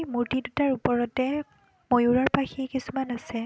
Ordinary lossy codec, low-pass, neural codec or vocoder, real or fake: none; none; none; real